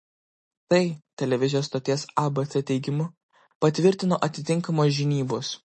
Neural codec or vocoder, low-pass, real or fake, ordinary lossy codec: none; 10.8 kHz; real; MP3, 32 kbps